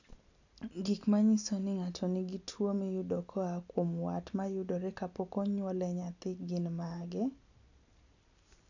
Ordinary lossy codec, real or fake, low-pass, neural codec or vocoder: none; real; 7.2 kHz; none